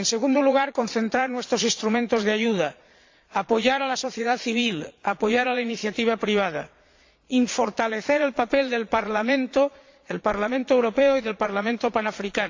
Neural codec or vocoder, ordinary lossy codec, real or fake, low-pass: vocoder, 22.05 kHz, 80 mel bands, Vocos; AAC, 48 kbps; fake; 7.2 kHz